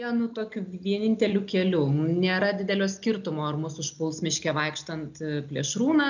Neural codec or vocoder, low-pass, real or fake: none; 7.2 kHz; real